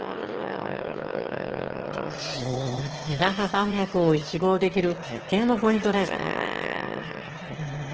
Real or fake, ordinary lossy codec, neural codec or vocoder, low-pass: fake; Opus, 16 kbps; autoencoder, 22.05 kHz, a latent of 192 numbers a frame, VITS, trained on one speaker; 7.2 kHz